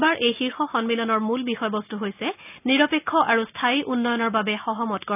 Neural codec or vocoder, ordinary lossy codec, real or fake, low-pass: none; none; real; 3.6 kHz